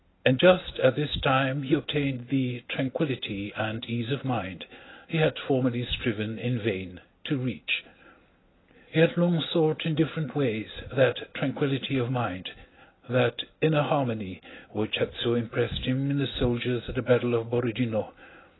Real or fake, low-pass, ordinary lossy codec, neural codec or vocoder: real; 7.2 kHz; AAC, 16 kbps; none